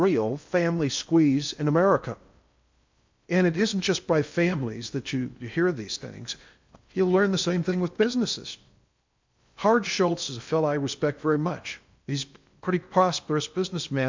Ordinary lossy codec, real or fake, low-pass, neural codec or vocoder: MP3, 64 kbps; fake; 7.2 kHz; codec, 16 kHz in and 24 kHz out, 0.6 kbps, FocalCodec, streaming, 2048 codes